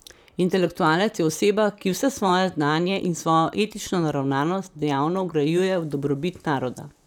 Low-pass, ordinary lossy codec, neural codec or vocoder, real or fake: 19.8 kHz; none; vocoder, 44.1 kHz, 128 mel bands, Pupu-Vocoder; fake